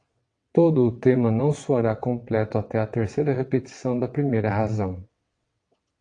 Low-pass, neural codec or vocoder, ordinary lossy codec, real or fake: 9.9 kHz; vocoder, 22.05 kHz, 80 mel bands, WaveNeXt; AAC, 48 kbps; fake